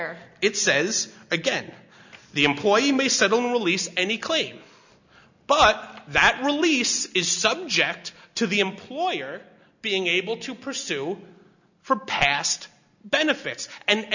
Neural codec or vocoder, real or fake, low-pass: none; real; 7.2 kHz